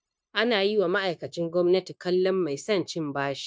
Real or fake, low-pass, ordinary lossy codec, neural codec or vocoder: fake; none; none; codec, 16 kHz, 0.9 kbps, LongCat-Audio-Codec